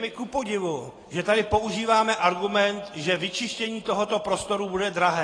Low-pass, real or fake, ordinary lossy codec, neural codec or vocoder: 9.9 kHz; real; AAC, 32 kbps; none